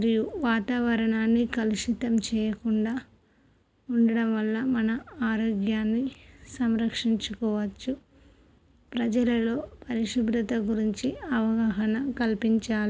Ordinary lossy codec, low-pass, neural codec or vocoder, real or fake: none; none; none; real